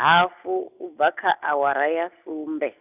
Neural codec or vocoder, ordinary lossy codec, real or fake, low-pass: none; none; real; 3.6 kHz